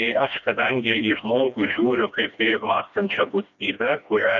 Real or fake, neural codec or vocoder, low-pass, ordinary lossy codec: fake; codec, 16 kHz, 1 kbps, FreqCodec, smaller model; 7.2 kHz; MP3, 96 kbps